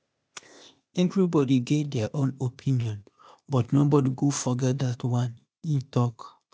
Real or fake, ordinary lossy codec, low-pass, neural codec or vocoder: fake; none; none; codec, 16 kHz, 0.8 kbps, ZipCodec